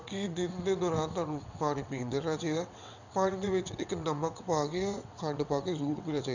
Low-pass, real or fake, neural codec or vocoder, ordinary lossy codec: 7.2 kHz; fake; vocoder, 22.05 kHz, 80 mel bands, WaveNeXt; none